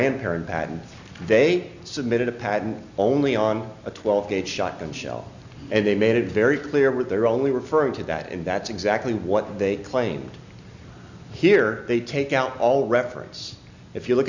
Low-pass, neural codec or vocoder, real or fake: 7.2 kHz; none; real